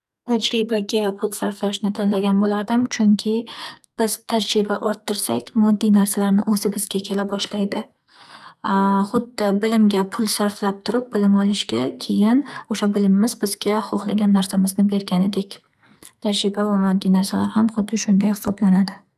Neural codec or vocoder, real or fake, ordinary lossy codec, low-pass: codec, 44.1 kHz, 2.6 kbps, SNAC; fake; none; 14.4 kHz